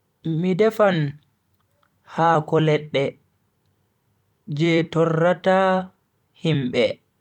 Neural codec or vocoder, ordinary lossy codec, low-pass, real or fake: vocoder, 44.1 kHz, 128 mel bands every 256 samples, BigVGAN v2; none; 19.8 kHz; fake